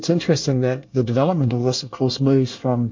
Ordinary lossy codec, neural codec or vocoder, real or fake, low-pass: MP3, 48 kbps; codec, 24 kHz, 1 kbps, SNAC; fake; 7.2 kHz